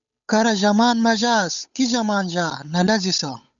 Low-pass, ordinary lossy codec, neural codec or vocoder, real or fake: 7.2 kHz; MP3, 96 kbps; codec, 16 kHz, 8 kbps, FunCodec, trained on Chinese and English, 25 frames a second; fake